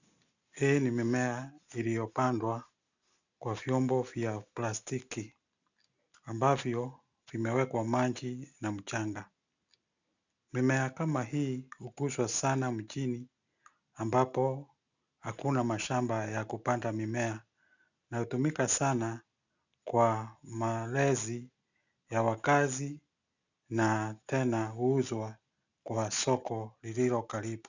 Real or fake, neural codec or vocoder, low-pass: real; none; 7.2 kHz